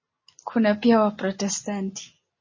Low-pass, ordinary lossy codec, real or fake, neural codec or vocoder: 7.2 kHz; MP3, 32 kbps; real; none